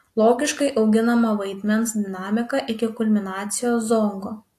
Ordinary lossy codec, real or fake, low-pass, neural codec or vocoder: AAC, 64 kbps; real; 14.4 kHz; none